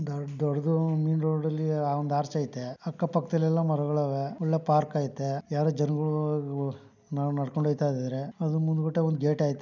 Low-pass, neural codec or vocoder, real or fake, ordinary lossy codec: 7.2 kHz; none; real; none